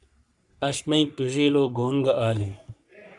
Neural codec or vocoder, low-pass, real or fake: codec, 44.1 kHz, 3.4 kbps, Pupu-Codec; 10.8 kHz; fake